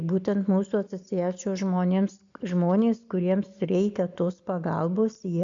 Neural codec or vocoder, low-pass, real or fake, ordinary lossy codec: none; 7.2 kHz; real; MP3, 64 kbps